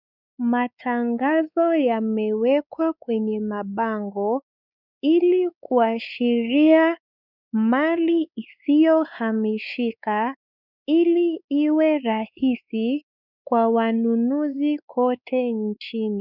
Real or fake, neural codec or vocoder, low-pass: fake; codec, 16 kHz, 4 kbps, X-Codec, WavLM features, trained on Multilingual LibriSpeech; 5.4 kHz